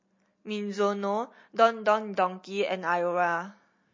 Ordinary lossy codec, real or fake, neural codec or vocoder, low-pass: MP3, 32 kbps; real; none; 7.2 kHz